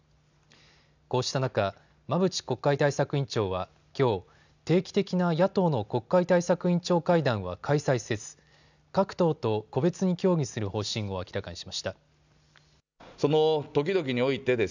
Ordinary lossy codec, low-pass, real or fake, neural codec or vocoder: none; 7.2 kHz; real; none